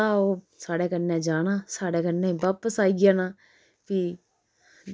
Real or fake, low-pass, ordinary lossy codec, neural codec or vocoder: real; none; none; none